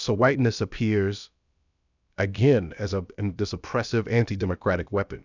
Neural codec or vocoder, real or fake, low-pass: codec, 16 kHz, about 1 kbps, DyCAST, with the encoder's durations; fake; 7.2 kHz